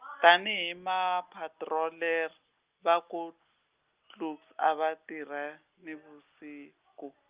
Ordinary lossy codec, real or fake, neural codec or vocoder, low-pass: Opus, 24 kbps; real; none; 3.6 kHz